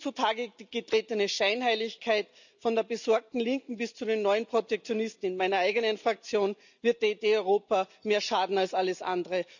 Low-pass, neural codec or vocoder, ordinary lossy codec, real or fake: 7.2 kHz; none; none; real